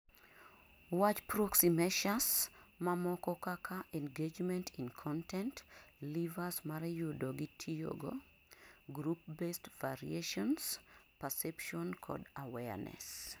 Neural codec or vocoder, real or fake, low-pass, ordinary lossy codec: none; real; none; none